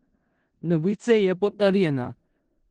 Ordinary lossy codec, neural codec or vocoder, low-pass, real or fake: Opus, 16 kbps; codec, 16 kHz in and 24 kHz out, 0.4 kbps, LongCat-Audio-Codec, four codebook decoder; 10.8 kHz; fake